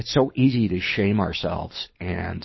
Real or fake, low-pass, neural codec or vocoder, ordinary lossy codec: fake; 7.2 kHz; codec, 24 kHz, 6 kbps, HILCodec; MP3, 24 kbps